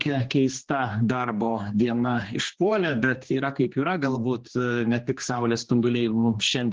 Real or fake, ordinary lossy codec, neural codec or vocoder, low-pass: fake; Opus, 16 kbps; codec, 16 kHz, 2 kbps, X-Codec, HuBERT features, trained on general audio; 7.2 kHz